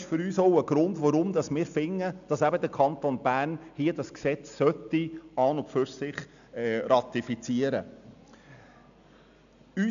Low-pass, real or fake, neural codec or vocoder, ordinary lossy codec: 7.2 kHz; real; none; none